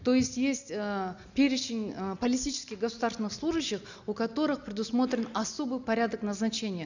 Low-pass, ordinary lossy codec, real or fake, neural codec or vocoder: 7.2 kHz; none; real; none